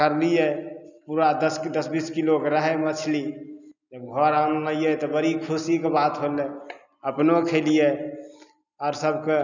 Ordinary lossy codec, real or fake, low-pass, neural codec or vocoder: none; real; 7.2 kHz; none